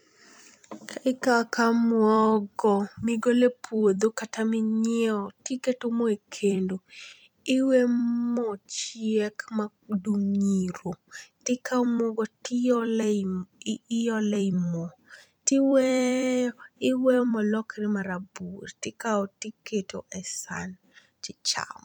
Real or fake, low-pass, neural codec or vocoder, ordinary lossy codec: fake; 19.8 kHz; vocoder, 44.1 kHz, 128 mel bands every 256 samples, BigVGAN v2; none